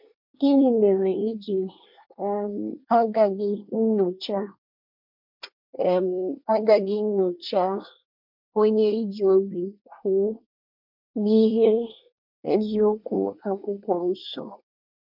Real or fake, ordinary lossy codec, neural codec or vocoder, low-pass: fake; MP3, 48 kbps; codec, 24 kHz, 1 kbps, SNAC; 5.4 kHz